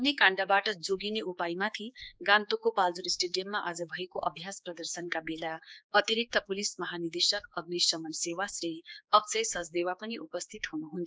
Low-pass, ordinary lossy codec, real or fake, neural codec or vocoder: none; none; fake; codec, 16 kHz, 4 kbps, X-Codec, HuBERT features, trained on general audio